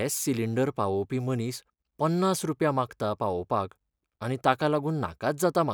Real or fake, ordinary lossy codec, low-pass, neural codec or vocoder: real; none; none; none